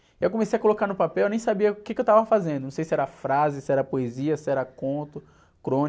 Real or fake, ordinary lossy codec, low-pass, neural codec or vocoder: real; none; none; none